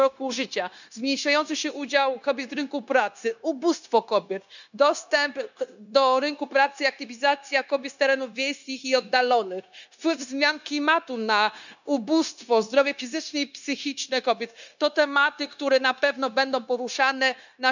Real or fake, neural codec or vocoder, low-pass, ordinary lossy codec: fake; codec, 16 kHz, 0.9 kbps, LongCat-Audio-Codec; 7.2 kHz; none